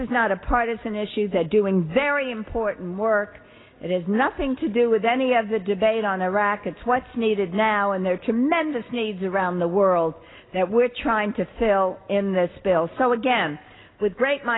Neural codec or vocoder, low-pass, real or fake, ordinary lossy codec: none; 7.2 kHz; real; AAC, 16 kbps